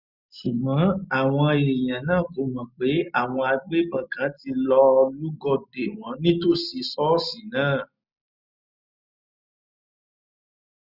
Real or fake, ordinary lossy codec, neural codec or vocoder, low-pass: real; none; none; 5.4 kHz